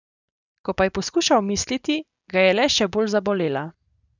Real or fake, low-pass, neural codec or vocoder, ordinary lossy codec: real; 7.2 kHz; none; none